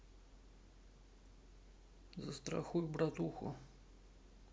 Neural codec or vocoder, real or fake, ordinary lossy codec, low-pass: none; real; none; none